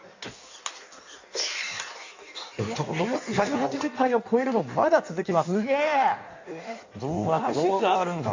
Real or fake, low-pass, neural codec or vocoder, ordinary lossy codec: fake; 7.2 kHz; codec, 16 kHz in and 24 kHz out, 1.1 kbps, FireRedTTS-2 codec; AAC, 48 kbps